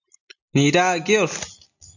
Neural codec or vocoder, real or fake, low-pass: none; real; 7.2 kHz